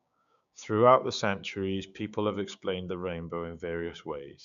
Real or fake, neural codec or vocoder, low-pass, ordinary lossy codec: fake; codec, 16 kHz, 6 kbps, DAC; 7.2 kHz; none